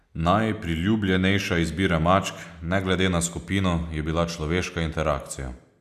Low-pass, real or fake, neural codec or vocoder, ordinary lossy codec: 14.4 kHz; real; none; none